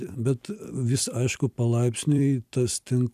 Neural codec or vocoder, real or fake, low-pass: vocoder, 44.1 kHz, 128 mel bands every 256 samples, BigVGAN v2; fake; 14.4 kHz